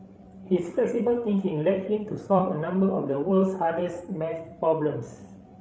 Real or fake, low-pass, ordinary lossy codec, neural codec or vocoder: fake; none; none; codec, 16 kHz, 8 kbps, FreqCodec, larger model